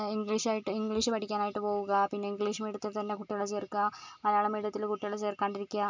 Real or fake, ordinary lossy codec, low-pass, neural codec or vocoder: real; MP3, 64 kbps; 7.2 kHz; none